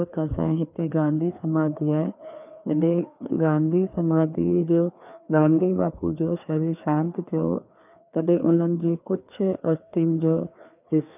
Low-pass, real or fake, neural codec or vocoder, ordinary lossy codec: 3.6 kHz; fake; codec, 16 kHz, 2 kbps, FreqCodec, larger model; none